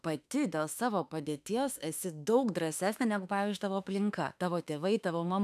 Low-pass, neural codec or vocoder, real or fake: 14.4 kHz; autoencoder, 48 kHz, 32 numbers a frame, DAC-VAE, trained on Japanese speech; fake